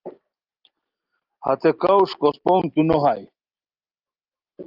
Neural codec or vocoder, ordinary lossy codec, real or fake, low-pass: none; Opus, 24 kbps; real; 5.4 kHz